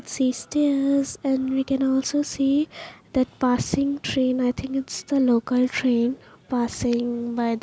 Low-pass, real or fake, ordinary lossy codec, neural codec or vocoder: none; real; none; none